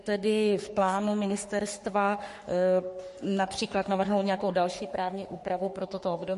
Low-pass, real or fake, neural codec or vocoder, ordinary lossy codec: 14.4 kHz; fake; codec, 44.1 kHz, 3.4 kbps, Pupu-Codec; MP3, 48 kbps